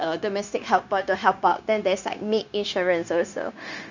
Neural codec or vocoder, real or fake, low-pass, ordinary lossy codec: codec, 16 kHz, 0.9 kbps, LongCat-Audio-Codec; fake; 7.2 kHz; none